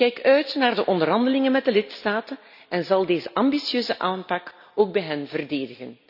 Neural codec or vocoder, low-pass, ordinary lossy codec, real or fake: none; 5.4 kHz; none; real